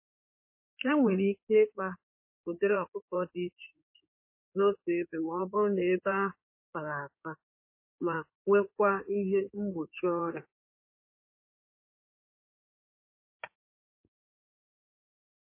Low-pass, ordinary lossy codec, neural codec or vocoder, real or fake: 3.6 kHz; MP3, 24 kbps; codec, 16 kHz in and 24 kHz out, 2.2 kbps, FireRedTTS-2 codec; fake